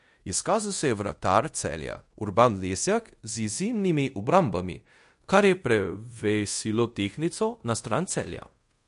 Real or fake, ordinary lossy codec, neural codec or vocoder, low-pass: fake; MP3, 48 kbps; codec, 24 kHz, 0.5 kbps, DualCodec; 10.8 kHz